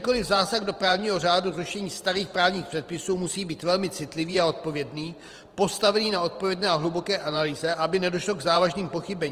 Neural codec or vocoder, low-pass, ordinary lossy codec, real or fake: vocoder, 44.1 kHz, 128 mel bands every 512 samples, BigVGAN v2; 14.4 kHz; Opus, 24 kbps; fake